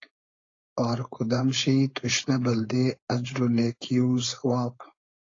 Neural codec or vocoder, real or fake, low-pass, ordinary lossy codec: codec, 16 kHz, 4.8 kbps, FACodec; fake; 7.2 kHz; AAC, 32 kbps